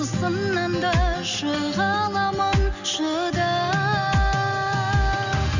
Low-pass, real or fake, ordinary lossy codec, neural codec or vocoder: 7.2 kHz; real; none; none